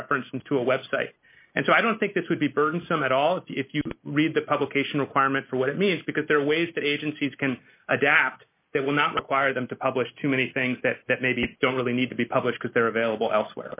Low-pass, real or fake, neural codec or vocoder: 3.6 kHz; real; none